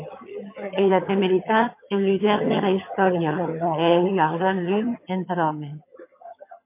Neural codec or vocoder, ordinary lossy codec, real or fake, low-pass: vocoder, 22.05 kHz, 80 mel bands, HiFi-GAN; MP3, 24 kbps; fake; 3.6 kHz